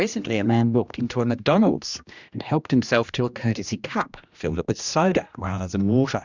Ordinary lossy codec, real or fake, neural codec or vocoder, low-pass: Opus, 64 kbps; fake; codec, 16 kHz, 1 kbps, X-Codec, HuBERT features, trained on general audio; 7.2 kHz